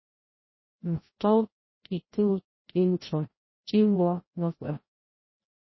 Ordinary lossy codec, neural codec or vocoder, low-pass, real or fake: MP3, 24 kbps; codec, 16 kHz, 0.5 kbps, FreqCodec, larger model; 7.2 kHz; fake